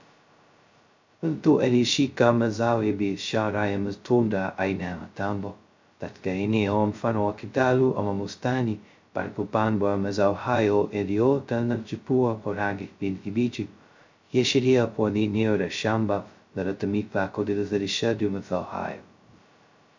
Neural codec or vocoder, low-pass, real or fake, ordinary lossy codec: codec, 16 kHz, 0.2 kbps, FocalCodec; 7.2 kHz; fake; MP3, 64 kbps